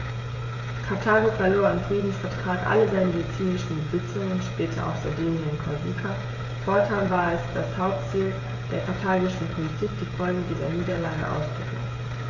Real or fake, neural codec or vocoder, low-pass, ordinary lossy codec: fake; codec, 16 kHz, 16 kbps, FreqCodec, smaller model; 7.2 kHz; AAC, 32 kbps